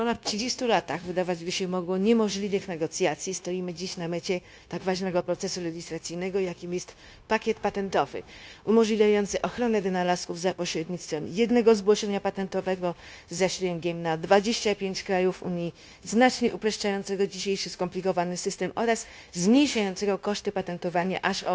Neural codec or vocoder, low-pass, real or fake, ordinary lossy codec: codec, 16 kHz, 0.9 kbps, LongCat-Audio-Codec; none; fake; none